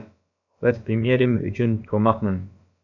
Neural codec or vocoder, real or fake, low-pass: codec, 16 kHz, about 1 kbps, DyCAST, with the encoder's durations; fake; 7.2 kHz